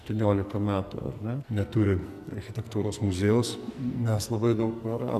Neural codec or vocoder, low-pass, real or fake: codec, 44.1 kHz, 2.6 kbps, SNAC; 14.4 kHz; fake